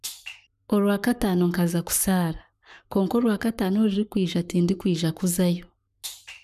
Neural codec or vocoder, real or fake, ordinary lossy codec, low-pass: codec, 44.1 kHz, 7.8 kbps, Pupu-Codec; fake; none; 14.4 kHz